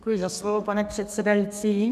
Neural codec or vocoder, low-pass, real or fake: codec, 32 kHz, 1.9 kbps, SNAC; 14.4 kHz; fake